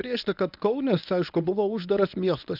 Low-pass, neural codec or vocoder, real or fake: 5.4 kHz; codec, 24 kHz, 0.9 kbps, WavTokenizer, medium speech release version 1; fake